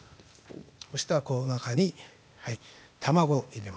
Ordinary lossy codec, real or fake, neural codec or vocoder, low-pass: none; fake; codec, 16 kHz, 0.8 kbps, ZipCodec; none